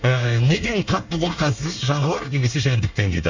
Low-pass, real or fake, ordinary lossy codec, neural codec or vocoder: 7.2 kHz; fake; Opus, 64 kbps; codec, 24 kHz, 1 kbps, SNAC